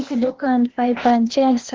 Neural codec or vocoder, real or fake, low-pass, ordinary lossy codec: codec, 16 kHz, 0.8 kbps, ZipCodec; fake; 7.2 kHz; Opus, 16 kbps